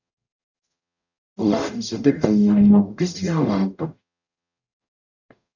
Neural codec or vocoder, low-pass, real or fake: codec, 44.1 kHz, 0.9 kbps, DAC; 7.2 kHz; fake